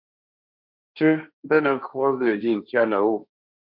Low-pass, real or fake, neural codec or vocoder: 5.4 kHz; fake; codec, 16 kHz, 1.1 kbps, Voila-Tokenizer